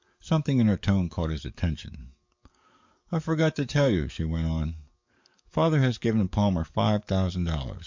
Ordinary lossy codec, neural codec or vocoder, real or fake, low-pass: MP3, 64 kbps; codec, 44.1 kHz, 7.8 kbps, DAC; fake; 7.2 kHz